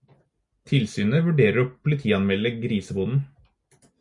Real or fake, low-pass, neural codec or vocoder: real; 10.8 kHz; none